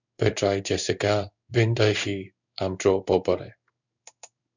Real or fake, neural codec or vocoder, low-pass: fake; codec, 16 kHz in and 24 kHz out, 1 kbps, XY-Tokenizer; 7.2 kHz